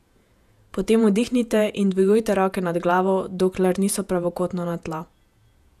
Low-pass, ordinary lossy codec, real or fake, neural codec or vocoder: 14.4 kHz; none; fake; vocoder, 48 kHz, 128 mel bands, Vocos